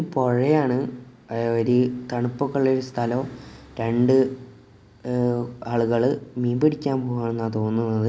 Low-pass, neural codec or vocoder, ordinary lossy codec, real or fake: none; none; none; real